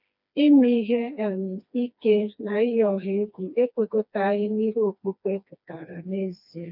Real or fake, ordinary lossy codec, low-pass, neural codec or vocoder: fake; none; 5.4 kHz; codec, 16 kHz, 2 kbps, FreqCodec, smaller model